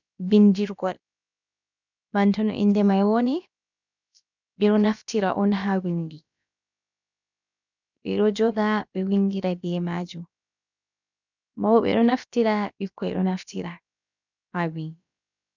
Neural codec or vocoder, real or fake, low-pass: codec, 16 kHz, about 1 kbps, DyCAST, with the encoder's durations; fake; 7.2 kHz